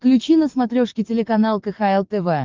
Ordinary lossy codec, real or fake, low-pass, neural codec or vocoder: Opus, 32 kbps; fake; 7.2 kHz; codec, 44.1 kHz, 7.8 kbps, DAC